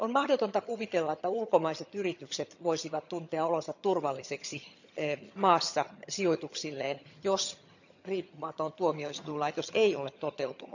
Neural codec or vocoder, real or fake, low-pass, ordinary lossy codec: vocoder, 22.05 kHz, 80 mel bands, HiFi-GAN; fake; 7.2 kHz; none